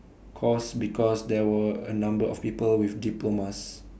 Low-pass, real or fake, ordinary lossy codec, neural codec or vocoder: none; real; none; none